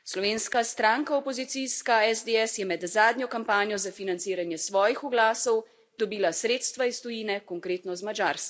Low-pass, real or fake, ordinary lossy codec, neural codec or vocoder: none; real; none; none